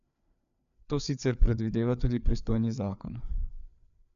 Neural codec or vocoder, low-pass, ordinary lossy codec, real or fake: codec, 16 kHz, 4 kbps, FreqCodec, larger model; 7.2 kHz; none; fake